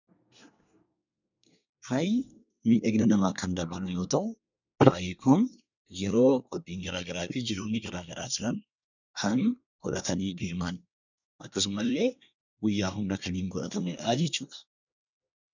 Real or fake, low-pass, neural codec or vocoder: fake; 7.2 kHz; codec, 24 kHz, 1 kbps, SNAC